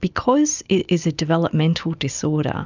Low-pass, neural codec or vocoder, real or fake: 7.2 kHz; none; real